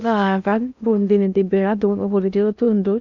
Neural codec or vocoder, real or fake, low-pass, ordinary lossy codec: codec, 16 kHz in and 24 kHz out, 0.6 kbps, FocalCodec, streaming, 4096 codes; fake; 7.2 kHz; none